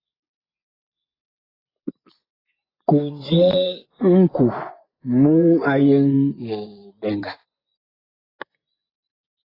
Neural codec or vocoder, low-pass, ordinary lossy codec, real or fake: vocoder, 24 kHz, 100 mel bands, Vocos; 5.4 kHz; AAC, 24 kbps; fake